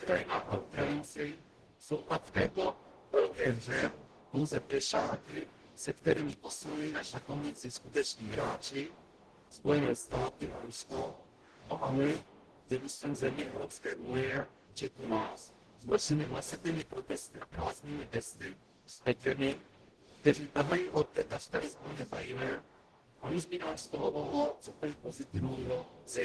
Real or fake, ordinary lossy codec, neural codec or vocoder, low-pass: fake; Opus, 16 kbps; codec, 44.1 kHz, 0.9 kbps, DAC; 10.8 kHz